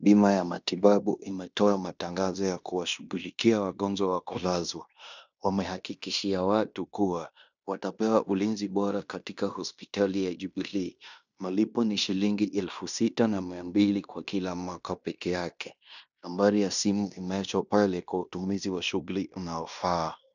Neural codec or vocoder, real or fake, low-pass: codec, 16 kHz in and 24 kHz out, 0.9 kbps, LongCat-Audio-Codec, fine tuned four codebook decoder; fake; 7.2 kHz